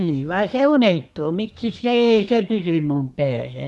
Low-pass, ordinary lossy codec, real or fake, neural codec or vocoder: none; none; fake; codec, 24 kHz, 1 kbps, SNAC